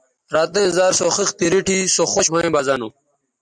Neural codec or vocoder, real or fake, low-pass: none; real; 9.9 kHz